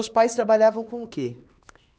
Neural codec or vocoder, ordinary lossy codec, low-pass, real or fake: codec, 16 kHz, 2 kbps, X-Codec, HuBERT features, trained on LibriSpeech; none; none; fake